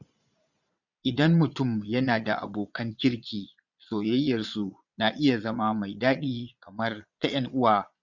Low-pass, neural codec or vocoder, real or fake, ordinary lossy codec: 7.2 kHz; vocoder, 22.05 kHz, 80 mel bands, Vocos; fake; none